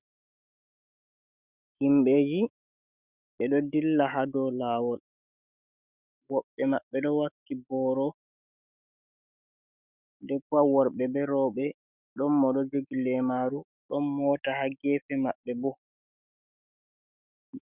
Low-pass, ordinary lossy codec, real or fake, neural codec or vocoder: 3.6 kHz; Opus, 64 kbps; fake; autoencoder, 48 kHz, 128 numbers a frame, DAC-VAE, trained on Japanese speech